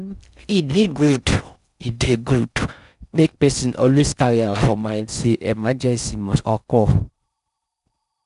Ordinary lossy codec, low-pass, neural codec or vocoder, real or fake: none; 10.8 kHz; codec, 16 kHz in and 24 kHz out, 0.6 kbps, FocalCodec, streaming, 4096 codes; fake